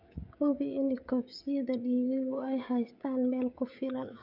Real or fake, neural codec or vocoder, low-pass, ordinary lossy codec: fake; codec, 16 kHz, 16 kbps, FreqCodec, smaller model; 5.4 kHz; none